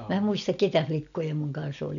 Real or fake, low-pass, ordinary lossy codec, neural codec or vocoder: real; 7.2 kHz; none; none